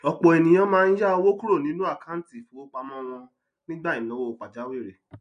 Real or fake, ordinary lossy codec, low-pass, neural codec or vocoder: real; MP3, 48 kbps; 9.9 kHz; none